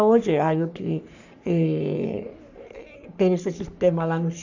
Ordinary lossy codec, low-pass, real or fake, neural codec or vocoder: none; 7.2 kHz; fake; codec, 44.1 kHz, 3.4 kbps, Pupu-Codec